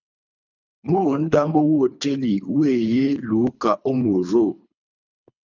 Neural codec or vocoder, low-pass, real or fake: codec, 24 kHz, 3 kbps, HILCodec; 7.2 kHz; fake